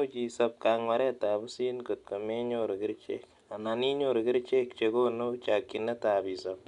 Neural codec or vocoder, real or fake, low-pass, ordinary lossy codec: none; real; 10.8 kHz; none